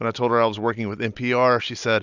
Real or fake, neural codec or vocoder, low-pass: real; none; 7.2 kHz